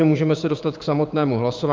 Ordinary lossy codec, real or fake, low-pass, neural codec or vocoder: Opus, 24 kbps; fake; 7.2 kHz; codec, 16 kHz, 6 kbps, DAC